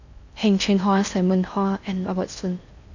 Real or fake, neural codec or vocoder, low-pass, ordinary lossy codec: fake; codec, 16 kHz in and 24 kHz out, 0.6 kbps, FocalCodec, streaming, 4096 codes; 7.2 kHz; none